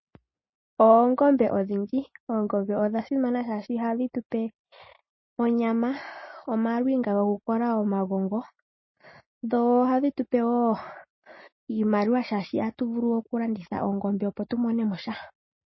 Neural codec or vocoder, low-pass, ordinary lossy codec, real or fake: none; 7.2 kHz; MP3, 24 kbps; real